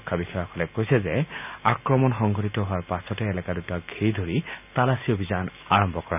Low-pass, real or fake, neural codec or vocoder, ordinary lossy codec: 3.6 kHz; real; none; none